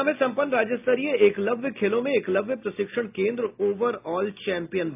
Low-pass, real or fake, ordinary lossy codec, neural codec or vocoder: 3.6 kHz; real; AAC, 32 kbps; none